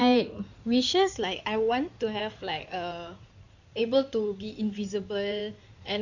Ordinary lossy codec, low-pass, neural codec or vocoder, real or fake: none; 7.2 kHz; codec, 16 kHz in and 24 kHz out, 2.2 kbps, FireRedTTS-2 codec; fake